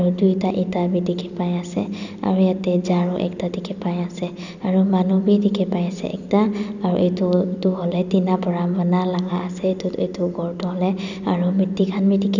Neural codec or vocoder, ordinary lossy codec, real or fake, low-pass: none; none; real; 7.2 kHz